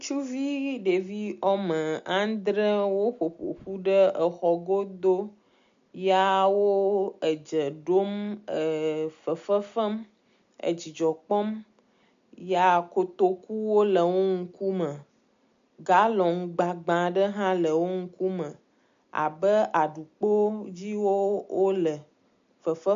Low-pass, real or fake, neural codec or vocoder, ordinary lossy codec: 7.2 kHz; real; none; MP3, 64 kbps